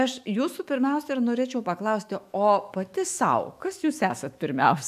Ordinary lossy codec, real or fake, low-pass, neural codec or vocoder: AAC, 96 kbps; fake; 14.4 kHz; autoencoder, 48 kHz, 128 numbers a frame, DAC-VAE, trained on Japanese speech